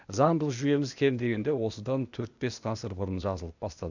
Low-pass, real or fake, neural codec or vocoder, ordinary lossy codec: 7.2 kHz; fake; codec, 16 kHz, 0.8 kbps, ZipCodec; none